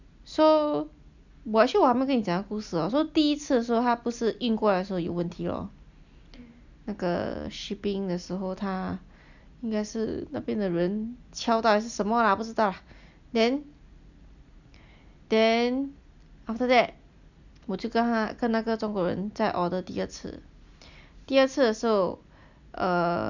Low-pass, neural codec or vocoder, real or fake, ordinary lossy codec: 7.2 kHz; none; real; none